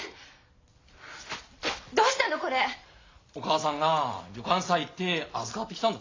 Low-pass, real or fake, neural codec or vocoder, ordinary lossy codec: 7.2 kHz; real; none; AAC, 32 kbps